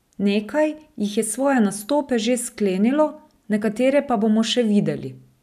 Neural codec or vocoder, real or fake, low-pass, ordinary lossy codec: none; real; 14.4 kHz; none